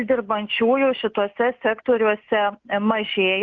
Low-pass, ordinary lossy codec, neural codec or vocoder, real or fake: 7.2 kHz; Opus, 24 kbps; none; real